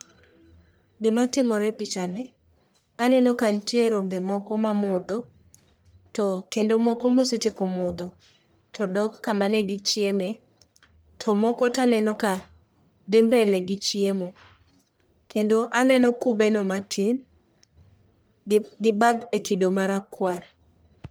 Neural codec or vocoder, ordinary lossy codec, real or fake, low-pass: codec, 44.1 kHz, 1.7 kbps, Pupu-Codec; none; fake; none